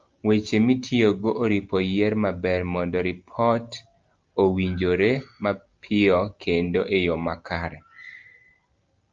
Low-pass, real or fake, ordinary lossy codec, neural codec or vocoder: 7.2 kHz; real; Opus, 24 kbps; none